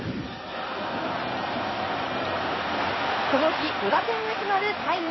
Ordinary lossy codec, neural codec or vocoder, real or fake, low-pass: MP3, 24 kbps; codec, 16 kHz in and 24 kHz out, 2.2 kbps, FireRedTTS-2 codec; fake; 7.2 kHz